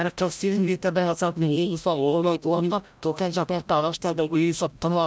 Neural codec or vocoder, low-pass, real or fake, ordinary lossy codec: codec, 16 kHz, 0.5 kbps, FreqCodec, larger model; none; fake; none